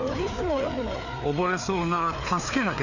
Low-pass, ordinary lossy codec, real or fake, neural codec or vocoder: 7.2 kHz; none; fake; codec, 16 kHz, 4 kbps, FreqCodec, larger model